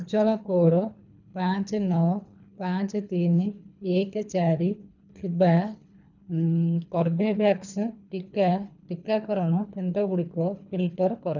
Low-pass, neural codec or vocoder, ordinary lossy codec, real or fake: 7.2 kHz; codec, 24 kHz, 3 kbps, HILCodec; none; fake